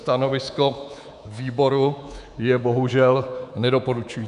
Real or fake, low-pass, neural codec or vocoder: fake; 10.8 kHz; codec, 24 kHz, 3.1 kbps, DualCodec